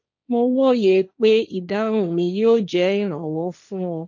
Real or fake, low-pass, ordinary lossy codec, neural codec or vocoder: fake; 7.2 kHz; none; codec, 16 kHz, 1.1 kbps, Voila-Tokenizer